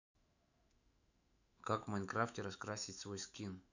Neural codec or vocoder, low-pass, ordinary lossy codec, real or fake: autoencoder, 48 kHz, 128 numbers a frame, DAC-VAE, trained on Japanese speech; 7.2 kHz; none; fake